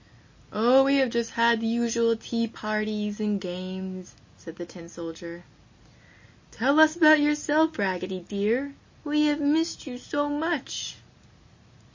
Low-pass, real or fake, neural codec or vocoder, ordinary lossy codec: 7.2 kHz; real; none; MP3, 32 kbps